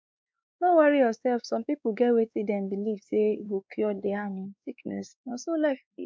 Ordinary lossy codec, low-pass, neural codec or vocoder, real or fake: none; none; codec, 16 kHz, 2 kbps, X-Codec, WavLM features, trained on Multilingual LibriSpeech; fake